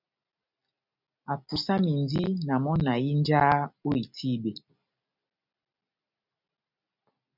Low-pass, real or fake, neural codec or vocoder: 5.4 kHz; real; none